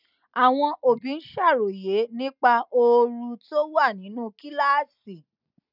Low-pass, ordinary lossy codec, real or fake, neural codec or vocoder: 5.4 kHz; none; real; none